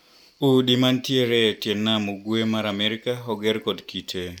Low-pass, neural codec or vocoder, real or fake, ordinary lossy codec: 19.8 kHz; none; real; none